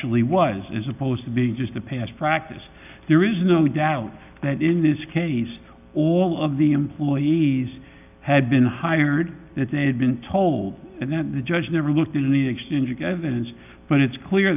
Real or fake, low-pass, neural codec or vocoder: real; 3.6 kHz; none